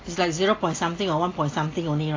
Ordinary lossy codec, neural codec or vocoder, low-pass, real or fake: AAC, 32 kbps; none; 7.2 kHz; real